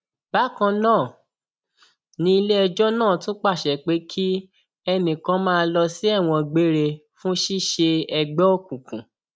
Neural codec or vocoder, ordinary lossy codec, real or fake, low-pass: none; none; real; none